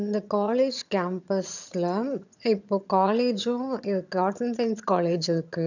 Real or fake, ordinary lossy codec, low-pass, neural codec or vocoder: fake; none; 7.2 kHz; vocoder, 22.05 kHz, 80 mel bands, HiFi-GAN